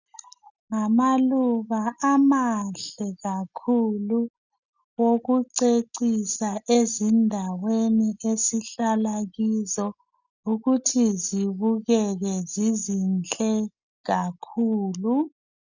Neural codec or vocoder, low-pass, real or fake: none; 7.2 kHz; real